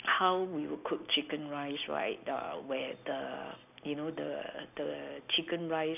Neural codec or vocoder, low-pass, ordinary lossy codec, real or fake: none; 3.6 kHz; none; real